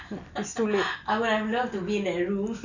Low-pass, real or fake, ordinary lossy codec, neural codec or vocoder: 7.2 kHz; real; none; none